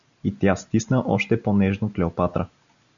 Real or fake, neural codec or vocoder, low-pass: real; none; 7.2 kHz